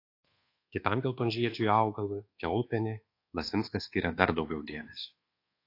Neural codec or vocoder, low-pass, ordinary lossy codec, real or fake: codec, 24 kHz, 1.2 kbps, DualCodec; 5.4 kHz; AAC, 32 kbps; fake